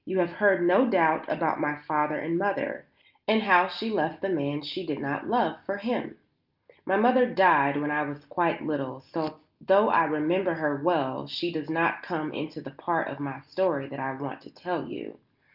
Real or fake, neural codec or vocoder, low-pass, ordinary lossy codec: real; none; 5.4 kHz; Opus, 24 kbps